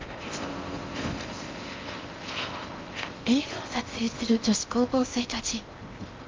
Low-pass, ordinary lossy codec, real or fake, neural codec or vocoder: 7.2 kHz; Opus, 32 kbps; fake; codec, 16 kHz in and 24 kHz out, 0.6 kbps, FocalCodec, streaming, 4096 codes